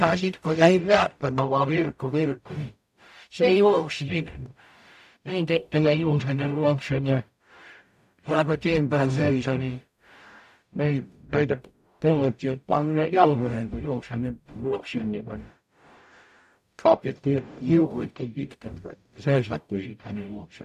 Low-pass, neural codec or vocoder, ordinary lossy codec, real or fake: 14.4 kHz; codec, 44.1 kHz, 0.9 kbps, DAC; AAC, 96 kbps; fake